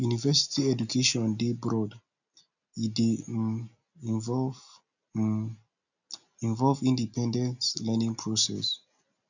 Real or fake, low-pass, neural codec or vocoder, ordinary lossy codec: real; 7.2 kHz; none; none